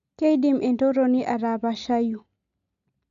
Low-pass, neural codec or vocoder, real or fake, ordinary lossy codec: 7.2 kHz; none; real; none